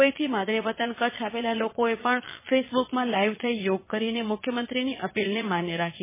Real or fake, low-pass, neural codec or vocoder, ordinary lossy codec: fake; 3.6 kHz; vocoder, 22.05 kHz, 80 mel bands, Vocos; MP3, 16 kbps